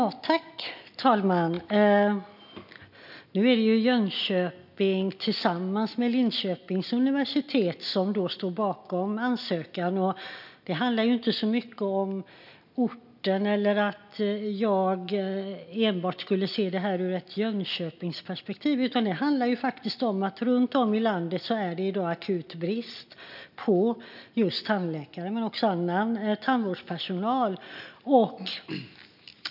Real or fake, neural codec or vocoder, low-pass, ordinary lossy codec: real; none; 5.4 kHz; none